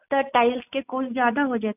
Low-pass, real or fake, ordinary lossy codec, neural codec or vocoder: 3.6 kHz; real; none; none